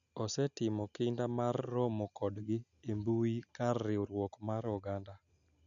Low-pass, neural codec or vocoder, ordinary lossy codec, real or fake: 7.2 kHz; none; none; real